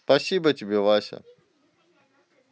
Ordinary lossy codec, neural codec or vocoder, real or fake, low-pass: none; none; real; none